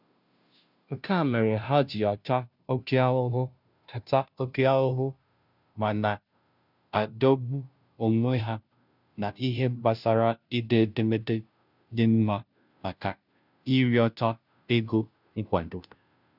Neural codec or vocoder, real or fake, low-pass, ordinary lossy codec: codec, 16 kHz, 0.5 kbps, FunCodec, trained on Chinese and English, 25 frames a second; fake; 5.4 kHz; none